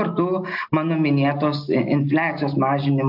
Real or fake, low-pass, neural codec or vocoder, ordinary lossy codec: real; 5.4 kHz; none; AAC, 48 kbps